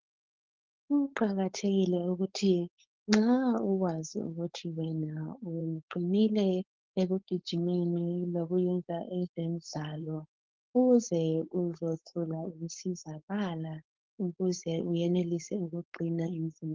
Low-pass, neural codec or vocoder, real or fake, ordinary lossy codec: 7.2 kHz; codec, 16 kHz, 4.8 kbps, FACodec; fake; Opus, 16 kbps